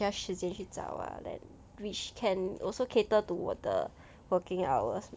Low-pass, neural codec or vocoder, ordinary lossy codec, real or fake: none; none; none; real